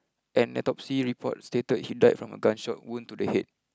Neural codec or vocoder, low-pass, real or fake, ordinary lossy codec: none; none; real; none